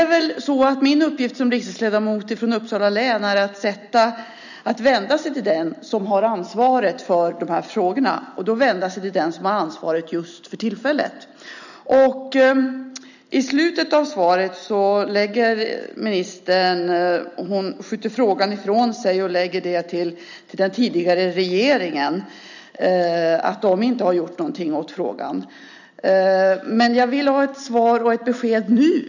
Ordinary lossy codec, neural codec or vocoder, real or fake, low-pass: none; none; real; 7.2 kHz